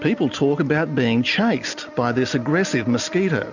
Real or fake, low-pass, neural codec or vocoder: real; 7.2 kHz; none